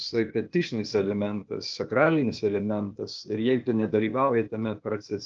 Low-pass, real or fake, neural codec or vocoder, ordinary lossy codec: 7.2 kHz; fake; codec, 16 kHz, 0.8 kbps, ZipCodec; Opus, 24 kbps